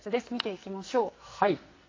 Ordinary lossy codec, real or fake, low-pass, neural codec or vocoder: AAC, 32 kbps; fake; 7.2 kHz; codec, 44.1 kHz, 2.6 kbps, SNAC